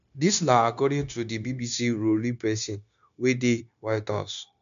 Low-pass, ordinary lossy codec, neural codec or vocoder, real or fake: 7.2 kHz; none; codec, 16 kHz, 0.9 kbps, LongCat-Audio-Codec; fake